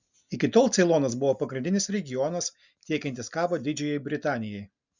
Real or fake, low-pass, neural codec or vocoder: real; 7.2 kHz; none